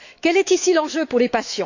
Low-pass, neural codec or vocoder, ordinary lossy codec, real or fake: 7.2 kHz; autoencoder, 48 kHz, 128 numbers a frame, DAC-VAE, trained on Japanese speech; none; fake